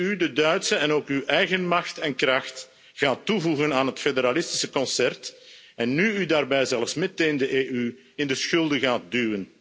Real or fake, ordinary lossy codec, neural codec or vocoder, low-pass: real; none; none; none